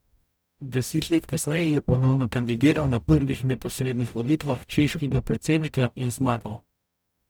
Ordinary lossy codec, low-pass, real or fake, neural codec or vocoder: none; none; fake; codec, 44.1 kHz, 0.9 kbps, DAC